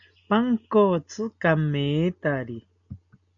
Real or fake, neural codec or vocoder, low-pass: real; none; 7.2 kHz